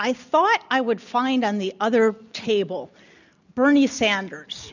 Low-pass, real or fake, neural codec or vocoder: 7.2 kHz; real; none